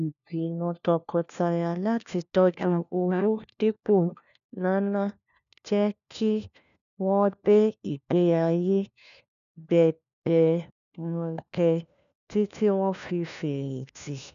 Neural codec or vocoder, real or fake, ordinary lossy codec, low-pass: codec, 16 kHz, 1 kbps, FunCodec, trained on LibriTTS, 50 frames a second; fake; none; 7.2 kHz